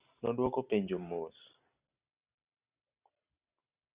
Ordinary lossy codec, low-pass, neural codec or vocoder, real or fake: Opus, 64 kbps; 3.6 kHz; none; real